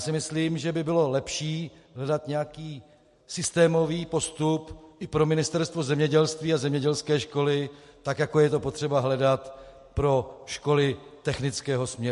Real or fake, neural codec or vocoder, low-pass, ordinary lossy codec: real; none; 14.4 kHz; MP3, 48 kbps